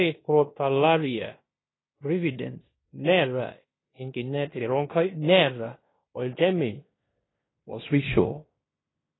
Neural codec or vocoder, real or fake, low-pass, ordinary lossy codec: codec, 16 kHz in and 24 kHz out, 0.9 kbps, LongCat-Audio-Codec, fine tuned four codebook decoder; fake; 7.2 kHz; AAC, 16 kbps